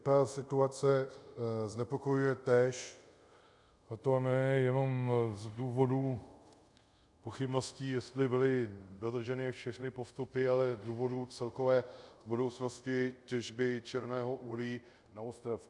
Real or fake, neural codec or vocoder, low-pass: fake; codec, 24 kHz, 0.5 kbps, DualCodec; 10.8 kHz